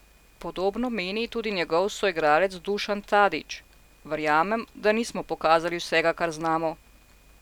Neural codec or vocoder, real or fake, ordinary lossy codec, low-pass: none; real; none; 19.8 kHz